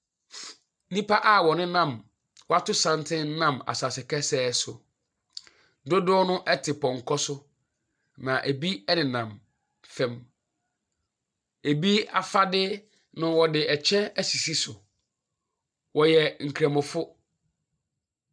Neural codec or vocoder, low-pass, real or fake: none; 9.9 kHz; real